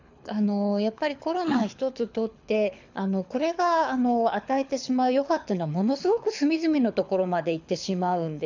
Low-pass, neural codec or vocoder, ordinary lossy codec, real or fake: 7.2 kHz; codec, 24 kHz, 6 kbps, HILCodec; none; fake